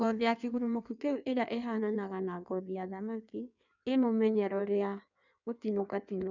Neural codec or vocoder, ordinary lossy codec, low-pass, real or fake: codec, 16 kHz in and 24 kHz out, 1.1 kbps, FireRedTTS-2 codec; none; 7.2 kHz; fake